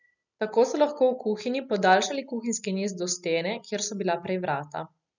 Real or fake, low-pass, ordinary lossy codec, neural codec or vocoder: real; 7.2 kHz; none; none